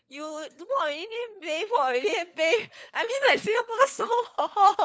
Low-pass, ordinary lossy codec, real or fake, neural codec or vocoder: none; none; fake; codec, 16 kHz, 4 kbps, FunCodec, trained on LibriTTS, 50 frames a second